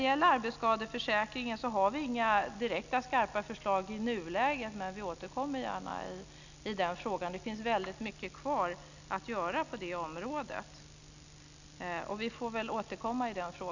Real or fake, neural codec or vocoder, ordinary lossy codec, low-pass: real; none; none; 7.2 kHz